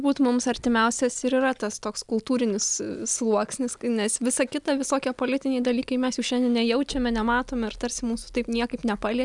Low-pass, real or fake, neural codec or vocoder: 10.8 kHz; real; none